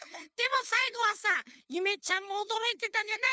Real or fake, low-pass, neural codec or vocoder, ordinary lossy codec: fake; none; codec, 16 kHz, 2 kbps, FunCodec, trained on LibriTTS, 25 frames a second; none